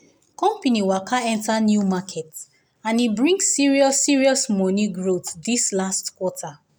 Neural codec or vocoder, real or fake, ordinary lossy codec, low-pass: none; real; none; none